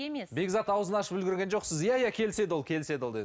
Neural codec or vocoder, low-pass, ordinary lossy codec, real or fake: none; none; none; real